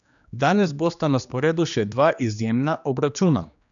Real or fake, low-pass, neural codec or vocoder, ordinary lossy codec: fake; 7.2 kHz; codec, 16 kHz, 2 kbps, X-Codec, HuBERT features, trained on general audio; none